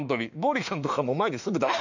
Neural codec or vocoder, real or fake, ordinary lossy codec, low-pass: autoencoder, 48 kHz, 32 numbers a frame, DAC-VAE, trained on Japanese speech; fake; none; 7.2 kHz